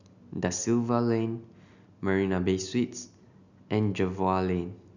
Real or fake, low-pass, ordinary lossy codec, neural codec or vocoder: real; 7.2 kHz; none; none